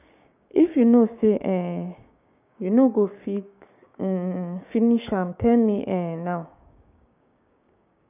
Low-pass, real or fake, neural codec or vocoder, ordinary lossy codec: 3.6 kHz; fake; codec, 16 kHz, 6 kbps, DAC; none